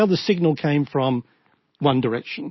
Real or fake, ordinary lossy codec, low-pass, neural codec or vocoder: real; MP3, 24 kbps; 7.2 kHz; none